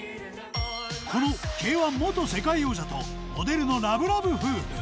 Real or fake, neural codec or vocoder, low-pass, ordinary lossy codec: real; none; none; none